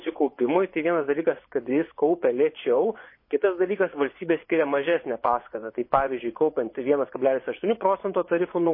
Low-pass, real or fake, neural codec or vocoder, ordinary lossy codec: 5.4 kHz; fake; vocoder, 24 kHz, 100 mel bands, Vocos; MP3, 24 kbps